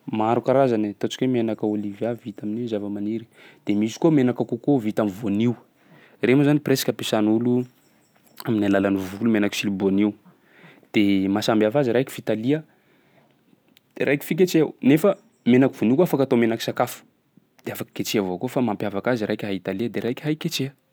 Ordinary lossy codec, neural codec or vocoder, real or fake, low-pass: none; none; real; none